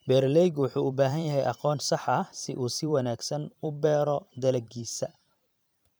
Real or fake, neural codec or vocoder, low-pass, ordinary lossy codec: fake; vocoder, 44.1 kHz, 128 mel bands every 512 samples, BigVGAN v2; none; none